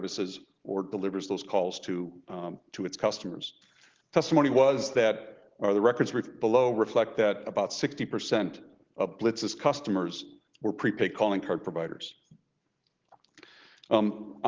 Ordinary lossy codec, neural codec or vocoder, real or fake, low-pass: Opus, 16 kbps; none; real; 7.2 kHz